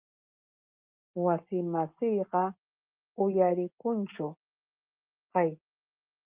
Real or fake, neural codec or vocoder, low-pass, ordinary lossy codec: real; none; 3.6 kHz; Opus, 24 kbps